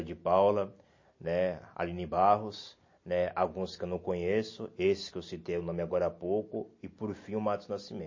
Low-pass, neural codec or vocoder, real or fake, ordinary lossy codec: 7.2 kHz; none; real; MP3, 32 kbps